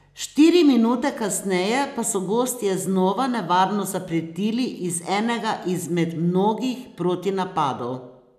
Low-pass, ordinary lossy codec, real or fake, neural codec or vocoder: 14.4 kHz; none; real; none